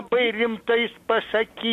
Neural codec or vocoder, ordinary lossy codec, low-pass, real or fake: none; MP3, 64 kbps; 14.4 kHz; real